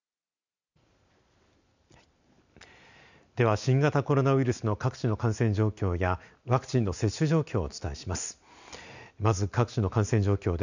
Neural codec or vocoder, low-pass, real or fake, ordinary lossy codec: none; 7.2 kHz; real; none